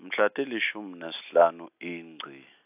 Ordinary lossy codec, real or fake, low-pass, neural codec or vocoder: AAC, 32 kbps; real; 3.6 kHz; none